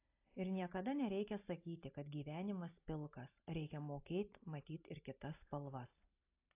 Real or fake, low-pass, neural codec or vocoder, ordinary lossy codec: real; 3.6 kHz; none; AAC, 32 kbps